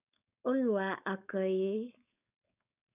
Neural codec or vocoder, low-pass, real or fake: codec, 16 kHz, 4.8 kbps, FACodec; 3.6 kHz; fake